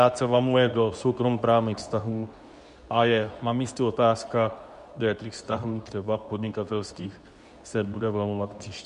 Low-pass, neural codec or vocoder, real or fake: 10.8 kHz; codec, 24 kHz, 0.9 kbps, WavTokenizer, medium speech release version 2; fake